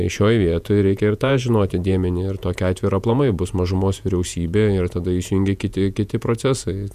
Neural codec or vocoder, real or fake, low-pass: vocoder, 48 kHz, 128 mel bands, Vocos; fake; 14.4 kHz